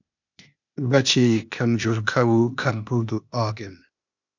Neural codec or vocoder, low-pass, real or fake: codec, 16 kHz, 0.8 kbps, ZipCodec; 7.2 kHz; fake